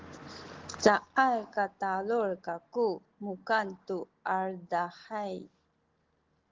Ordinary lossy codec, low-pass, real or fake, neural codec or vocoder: Opus, 16 kbps; 7.2 kHz; real; none